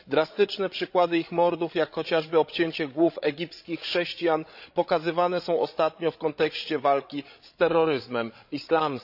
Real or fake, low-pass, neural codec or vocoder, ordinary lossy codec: fake; 5.4 kHz; codec, 16 kHz, 16 kbps, FreqCodec, larger model; AAC, 48 kbps